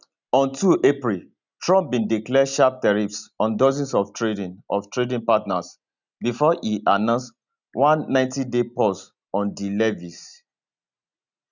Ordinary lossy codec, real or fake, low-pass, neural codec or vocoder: none; real; 7.2 kHz; none